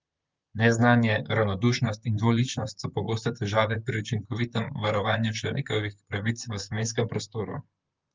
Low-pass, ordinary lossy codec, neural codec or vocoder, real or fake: 7.2 kHz; Opus, 24 kbps; vocoder, 44.1 kHz, 128 mel bands, Pupu-Vocoder; fake